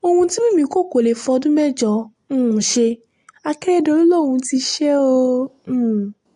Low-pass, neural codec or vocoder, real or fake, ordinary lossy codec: 10.8 kHz; none; real; AAC, 48 kbps